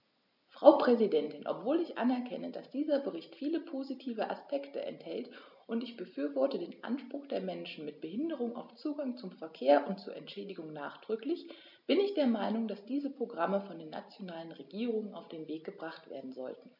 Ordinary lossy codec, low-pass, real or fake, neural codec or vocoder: none; 5.4 kHz; real; none